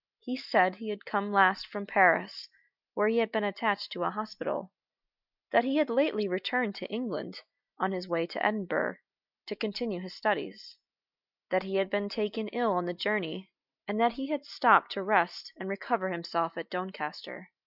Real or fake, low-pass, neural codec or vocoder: real; 5.4 kHz; none